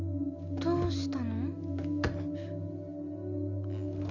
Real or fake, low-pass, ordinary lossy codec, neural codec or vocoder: real; 7.2 kHz; none; none